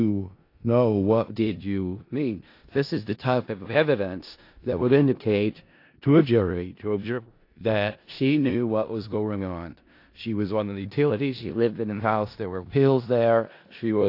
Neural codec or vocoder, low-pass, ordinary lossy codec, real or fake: codec, 16 kHz in and 24 kHz out, 0.4 kbps, LongCat-Audio-Codec, four codebook decoder; 5.4 kHz; AAC, 32 kbps; fake